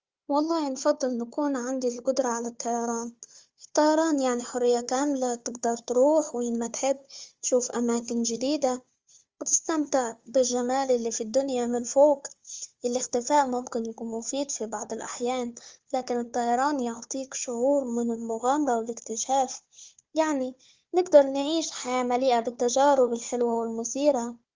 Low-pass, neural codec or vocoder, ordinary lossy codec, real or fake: 7.2 kHz; codec, 16 kHz, 4 kbps, FunCodec, trained on Chinese and English, 50 frames a second; Opus, 32 kbps; fake